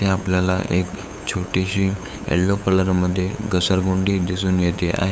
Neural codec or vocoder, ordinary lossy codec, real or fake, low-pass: codec, 16 kHz, 8 kbps, FunCodec, trained on LibriTTS, 25 frames a second; none; fake; none